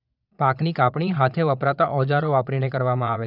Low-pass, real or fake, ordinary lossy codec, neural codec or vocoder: 5.4 kHz; fake; none; vocoder, 22.05 kHz, 80 mel bands, Vocos